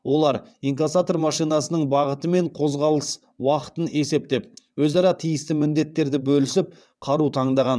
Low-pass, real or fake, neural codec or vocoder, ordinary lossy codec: none; fake; vocoder, 22.05 kHz, 80 mel bands, WaveNeXt; none